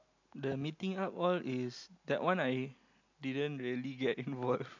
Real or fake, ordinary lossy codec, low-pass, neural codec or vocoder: real; MP3, 64 kbps; 7.2 kHz; none